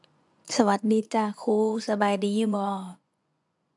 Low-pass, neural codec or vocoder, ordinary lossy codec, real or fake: 10.8 kHz; vocoder, 24 kHz, 100 mel bands, Vocos; none; fake